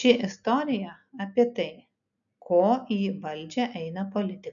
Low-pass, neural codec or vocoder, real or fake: 7.2 kHz; none; real